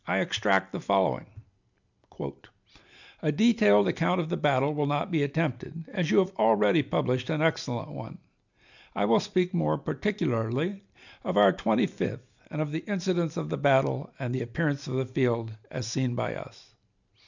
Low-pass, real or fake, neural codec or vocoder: 7.2 kHz; real; none